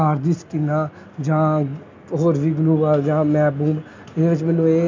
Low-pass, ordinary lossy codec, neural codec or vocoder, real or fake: 7.2 kHz; MP3, 64 kbps; none; real